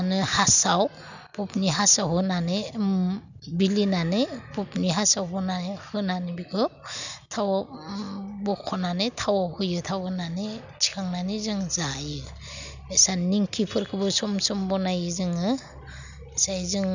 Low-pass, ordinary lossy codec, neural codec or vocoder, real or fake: 7.2 kHz; none; none; real